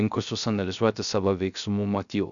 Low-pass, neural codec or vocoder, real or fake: 7.2 kHz; codec, 16 kHz, 0.3 kbps, FocalCodec; fake